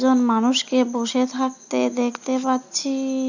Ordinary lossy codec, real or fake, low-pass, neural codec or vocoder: none; real; 7.2 kHz; none